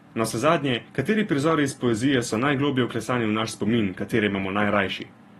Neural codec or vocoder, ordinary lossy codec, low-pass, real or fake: none; AAC, 32 kbps; 19.8 kHz; real